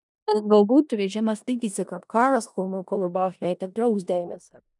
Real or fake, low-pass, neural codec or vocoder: fake; 10.8 kHz; codec, 16 kHz in and 24 kHz out, 0.4 kbps, LongCat-Audio-Codec, four codebook decoder